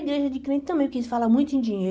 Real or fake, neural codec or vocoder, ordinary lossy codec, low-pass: real; none; none; none